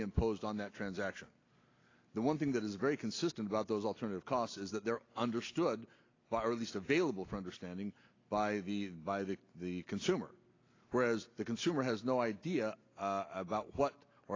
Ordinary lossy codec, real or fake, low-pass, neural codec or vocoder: AAC, 32 kbps; real; 7.2 kHz; none